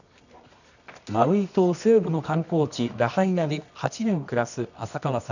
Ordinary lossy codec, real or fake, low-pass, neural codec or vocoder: none; fake; 7.2 kHz; codec, 24 kHz, 0.9 kbps, WavTokenizer, medium music audio release